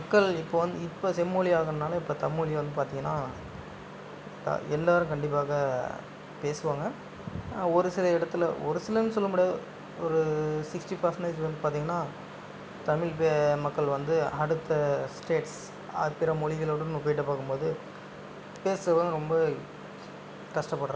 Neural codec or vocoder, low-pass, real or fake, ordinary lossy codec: none; none; real; none